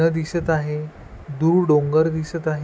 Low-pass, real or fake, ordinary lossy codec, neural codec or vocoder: none; real; none; none